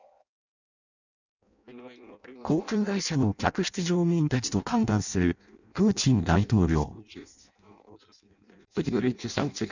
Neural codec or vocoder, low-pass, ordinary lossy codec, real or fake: codec, 16 kHz in and 24 kHz out, 0.6 kbps, FireRedTTS-2 codec; 7.2 kHz; none; fake